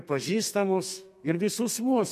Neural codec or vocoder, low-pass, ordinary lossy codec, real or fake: codec, 32 kHz, 1.9 kbps, SNAC; 14.4 kHz; MP3, 64 kbps; fake